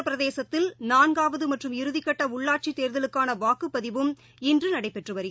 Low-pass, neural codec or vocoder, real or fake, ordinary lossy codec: none; none; real; none